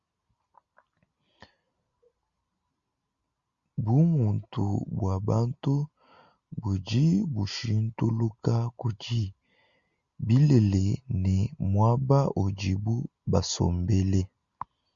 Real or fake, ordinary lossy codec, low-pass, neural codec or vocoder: real; Opus, 64 kbps; 7.2 kHz; none